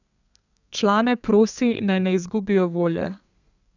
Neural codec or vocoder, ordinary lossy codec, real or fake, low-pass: codec, 32 kHz, 1.9 kbps, SNAC; none; fake; 7.2 kHz